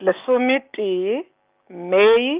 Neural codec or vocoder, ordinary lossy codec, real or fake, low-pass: none; Opus, 24 kbps; real; 3.6 kHz